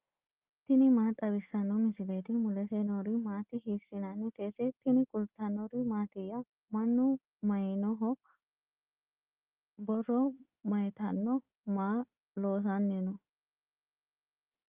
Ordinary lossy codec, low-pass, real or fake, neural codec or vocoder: Opus, 32 kbps; 3.6 kHz; real; none